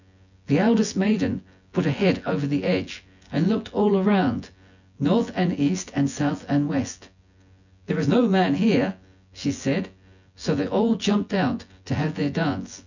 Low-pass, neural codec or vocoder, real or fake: 7.2 kHz; vocoder, 24 kHz, 100 mel bands, Vocos; fake